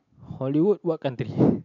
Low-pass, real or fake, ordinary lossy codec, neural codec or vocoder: 7.2 kHz; real; MP3, 64 kbps; none